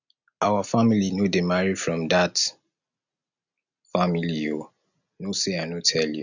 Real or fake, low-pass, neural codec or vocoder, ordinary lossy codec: real; 7.2 kHz; none; none